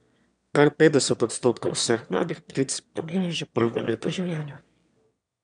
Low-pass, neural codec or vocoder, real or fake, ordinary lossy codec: 9.9 kHz; autoencoder, 22.05 kHz, a latent of 192 numbers a frame, VITS, trained on one speaker; fake; none